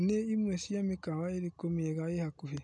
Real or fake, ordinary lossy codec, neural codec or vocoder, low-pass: real; none; none; 10.8 kHz